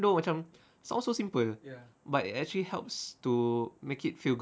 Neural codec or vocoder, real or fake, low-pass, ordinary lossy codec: none; real; none; none